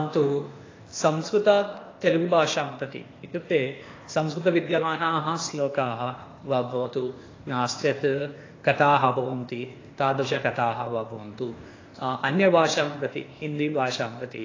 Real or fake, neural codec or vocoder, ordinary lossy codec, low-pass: fake; codec, 16 kHz, 0.8 kbps, ZipCodec; AAC, 32 kbps; 7.2 kHz